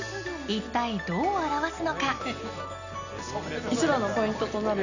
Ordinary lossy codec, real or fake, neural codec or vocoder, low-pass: none; real; none; 7.2 kHz